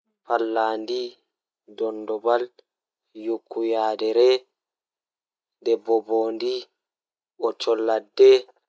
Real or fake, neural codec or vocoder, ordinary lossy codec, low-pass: real; none; none; none